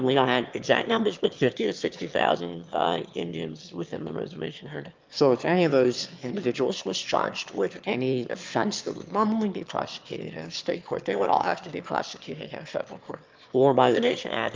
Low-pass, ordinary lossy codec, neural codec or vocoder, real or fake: 7.2 kHz; Opus, 24 kbps; autoencoder, 22.05 kHz, a latent of 192 numbers a frame, VITS, trained on one speaker; fake